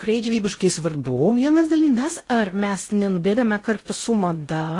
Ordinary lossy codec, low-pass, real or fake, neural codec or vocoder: AAC, 48 kbps; 10.8 kHz; fake; codec, 16 kHz in and 24 kHz out, 0.6 kbps, FocalCodec, streaming, 2048 codes